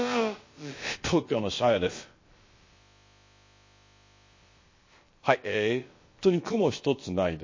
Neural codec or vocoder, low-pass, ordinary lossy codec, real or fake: codec, 16 kHz, about 1 kbps, DyCAST, with the encoder's durations; 7.2 kHz; MP3, 32 kbps; fake